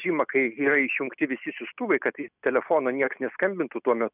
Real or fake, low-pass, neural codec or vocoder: real; 3.6 kHz; none